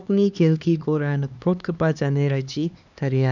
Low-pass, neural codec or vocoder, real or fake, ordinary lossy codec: 7.2 kHz; codec, 16 kHz, 2 kbps, X-Codec, HuBERT features, trained on LibriSpeech; fake; none